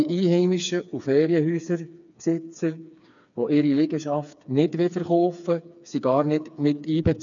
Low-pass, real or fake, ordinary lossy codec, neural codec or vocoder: 7.2 kHz; fake; none; codec, 16 kHz, 4 kbps, FreqCodec, smaller model